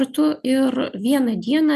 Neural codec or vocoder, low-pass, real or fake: none; 14.4 kHz; real